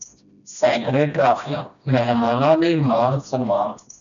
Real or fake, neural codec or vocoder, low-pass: fake; codec, 16 kHz, 1 kbps, FreqCodec, smaller model; 7.2 kHz